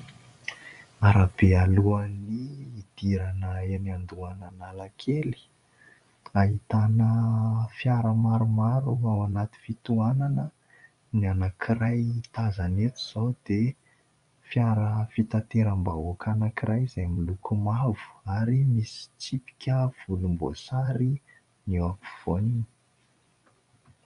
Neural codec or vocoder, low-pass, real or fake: vocoder, 24 kHz, 100 mel bands, Vocos; 10.8 kHz; fake